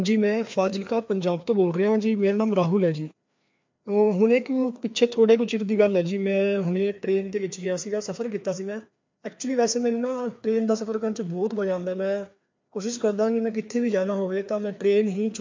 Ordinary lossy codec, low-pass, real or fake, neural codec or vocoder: MP3, 48 kbps; 7.2 kHz; fake; codec, 16 kHz, 2 kbps, FreqCodec, larger model